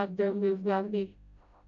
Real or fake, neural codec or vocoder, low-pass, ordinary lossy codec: fake; codec, 16 kHz, 0.5 kbps, FreqCodec, smaller model; 7.2 kHz; MP3, 64 kbps